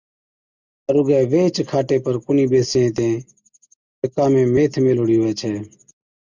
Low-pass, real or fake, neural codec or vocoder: 7.2 kHz; real; none